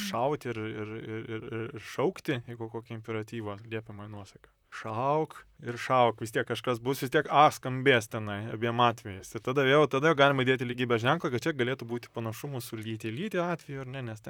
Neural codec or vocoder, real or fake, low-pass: vocoder, 44.1 kHz, 128 mel bands, Pupu-Vocoder; fake; 19.8 kHz